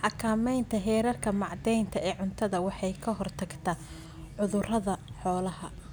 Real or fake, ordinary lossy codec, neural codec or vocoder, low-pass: real; none; none; none